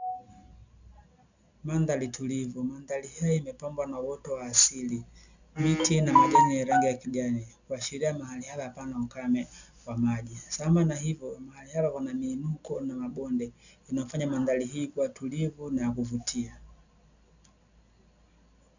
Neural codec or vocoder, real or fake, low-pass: none; real; 7.2 kHz